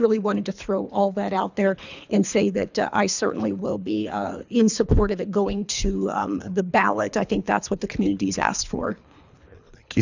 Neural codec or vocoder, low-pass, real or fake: codec, 24 kHz, 3 kbps, HILCodec; 7.2 kHz; fake